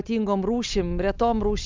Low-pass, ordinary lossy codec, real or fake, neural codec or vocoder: 7.2 kHz; Opus, 24 kbps; real; none